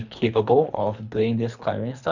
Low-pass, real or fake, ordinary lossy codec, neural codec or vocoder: 7.2 kHz; fake; none; codec, 24 kHz, 3 kbps, HILCodec